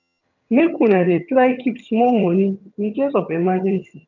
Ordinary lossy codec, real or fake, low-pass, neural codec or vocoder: none; fake; 7.2 kHz; vocoder, 22.05 kHz, 80 mel bands, HiFi-GAN